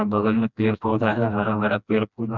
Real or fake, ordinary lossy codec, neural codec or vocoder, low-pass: fake; none; codec, 16 kHz, 1 kbps, FreqCodec, smaller model; 7.2 kHz